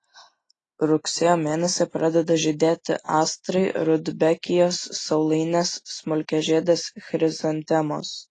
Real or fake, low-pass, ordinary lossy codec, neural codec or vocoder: real; 10.8 kHz; AAC, 32 kbps; none